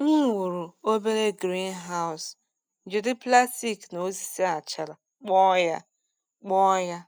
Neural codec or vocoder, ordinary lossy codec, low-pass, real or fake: none; none; none; real